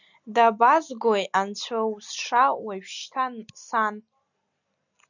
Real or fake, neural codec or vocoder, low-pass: real; none; 7.2 kHz